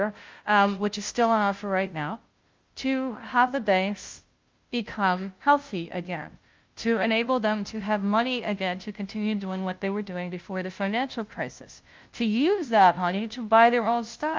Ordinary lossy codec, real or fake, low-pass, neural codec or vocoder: Opus, 32 kbps; fake; 7.2 kHz; codec, 16 kHz, 0.5 kbps, FunCodec, trained on Chinese and English, 25 frames a second